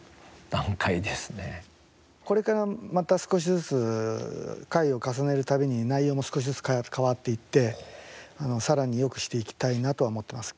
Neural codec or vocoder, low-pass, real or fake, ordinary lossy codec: none; none; real; none